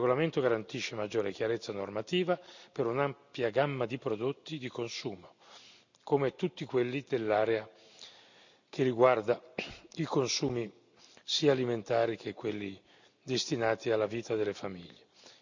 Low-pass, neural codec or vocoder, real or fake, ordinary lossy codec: 7.2 kHz; none; real; none